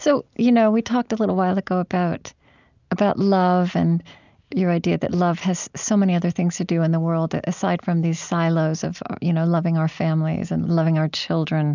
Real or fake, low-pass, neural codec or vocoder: real; 7.2 kHz; none